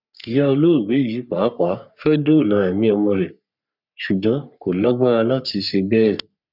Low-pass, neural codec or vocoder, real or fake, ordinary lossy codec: 5.4 kHz; codec, 44.1 kHz, 3.4 kbps, Pupu-Codec; fake; none